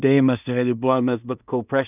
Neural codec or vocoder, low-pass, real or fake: codec, 16 kHz in and 24 kHz out, 0.4 kbps, LongCat-Audio-Codec, two codebook decoder; 3.6 kHz; fake